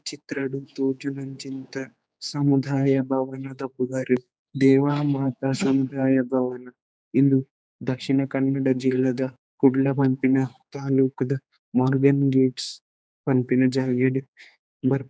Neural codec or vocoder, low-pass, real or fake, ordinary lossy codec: codec, 16 kHz, 4 kbps, X-Codec, HuBERT features, trained on general audio; none; fake; none